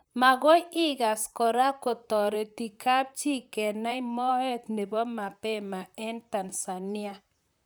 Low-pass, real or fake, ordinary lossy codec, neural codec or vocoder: none; fake; none; vocoder, 44.1 kHz, 128 mel bands, Pupu-Vocoder